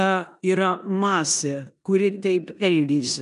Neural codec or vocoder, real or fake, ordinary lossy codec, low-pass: codec, 16 kHz in and 24 kHz out, 0.9 kbps, LongCat-Audio-Codec, four codebook decoder; fake; MP3, 96 kbps; 10.8 kHz